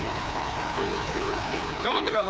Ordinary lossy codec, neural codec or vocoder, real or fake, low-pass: none; codec, 16 kHz, 2 kbps, FreqCodec, larger model; fake; none